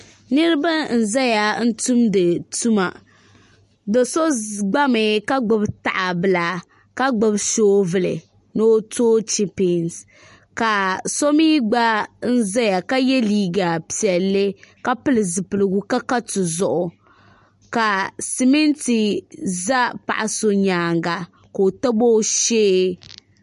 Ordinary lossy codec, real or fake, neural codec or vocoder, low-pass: MP3, 48 kbps; real; none; 14.4 kHz